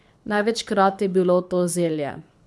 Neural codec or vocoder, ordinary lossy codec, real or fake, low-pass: codec, 24 kHz, 6 kbps, HILCodec; none; fake; none